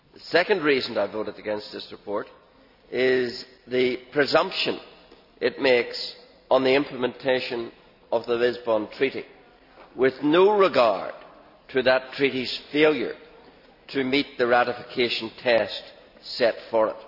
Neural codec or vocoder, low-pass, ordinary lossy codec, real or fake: none; 5.4 kHz; none; real